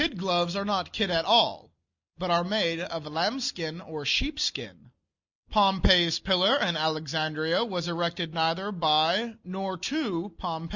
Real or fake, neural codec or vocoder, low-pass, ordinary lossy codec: real; none; 7.2 kHz; Opus, 64 kbps